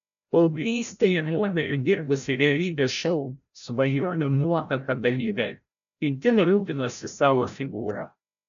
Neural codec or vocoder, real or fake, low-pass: codec, 16 kHz, 0.5 kbps, FreqCodec, larger model; fake; 7.2 kHz